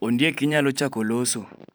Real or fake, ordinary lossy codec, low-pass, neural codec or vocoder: fake; none; none; vocoder, 44.1 kHz, 128 mel bands every 512 samples, BigVGAN v2